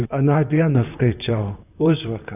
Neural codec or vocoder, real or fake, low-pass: vocoder, 44.1 kHz, 128 mel bands, Pupu-Vocoder; fake; 3.6 kHz